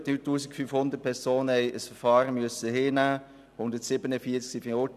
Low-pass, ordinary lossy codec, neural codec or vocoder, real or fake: 14.4 kHz; none; none; real